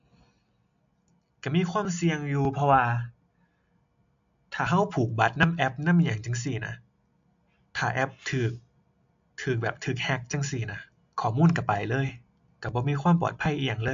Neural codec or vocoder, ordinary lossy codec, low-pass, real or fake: none; AAC, 64 kbps; 7.2 kHz; real